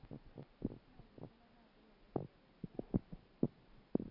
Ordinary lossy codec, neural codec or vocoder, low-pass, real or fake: none; none; 5.4 kHz; real